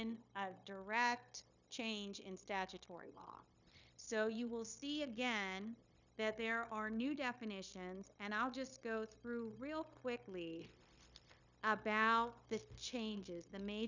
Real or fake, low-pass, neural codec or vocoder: fake; 7.2 kHz; codec, 16 kHz, 0.9 kbps, LongCat-Audio-Codec